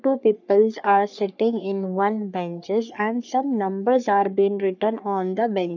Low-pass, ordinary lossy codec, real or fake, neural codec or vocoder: 7.2 kHz; none; fake; codec, 44.1 kHz, 3.4 kbps, Pupu-Codec